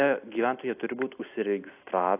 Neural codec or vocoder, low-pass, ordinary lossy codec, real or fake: none; 3.6 kHz; AAC, 32 kbps; real